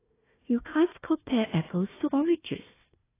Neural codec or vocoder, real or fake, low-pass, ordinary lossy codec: codec, 16 kHz, 1 kbps, FunCodec, trained on Chinese and English, 50 frames a second; fake; 3.6 kHz; AAC, 16 kbps